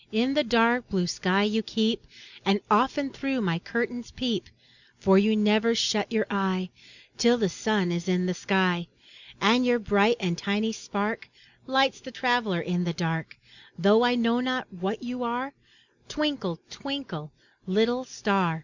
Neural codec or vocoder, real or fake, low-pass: none; real; 7.2 kHz